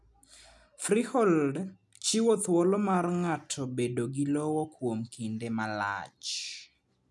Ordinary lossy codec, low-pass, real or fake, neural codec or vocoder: none; none; real; none